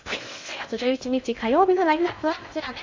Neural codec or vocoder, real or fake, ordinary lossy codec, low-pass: codec, 16 kHz in and 24 kHz out, 0.6 kbps, FocalCodec, streaming, 2048 codes; fake; MP3, 64 kbps; 7.2 kHz